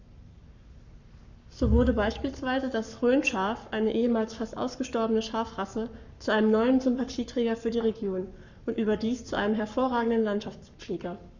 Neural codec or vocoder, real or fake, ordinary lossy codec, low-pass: codec, 44.1 kHz, 7.8 kbps, Pupu-Codec; fake; none; 7.2 kHz